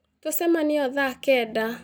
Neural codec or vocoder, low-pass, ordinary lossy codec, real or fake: none; 19.8 kHz; none; real